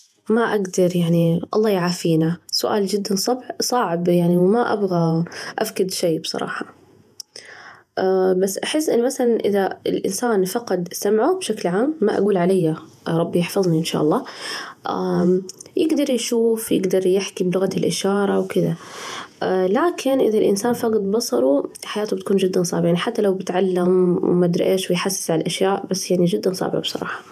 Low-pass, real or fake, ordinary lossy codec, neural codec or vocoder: 14.4 kHz; fake; none; vocoder, 48 kHz, 128 mel bands, Vocos